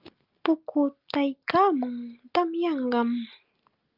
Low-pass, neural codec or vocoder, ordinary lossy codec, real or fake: 5.4 kHz; none; Opus, 32 kbps; real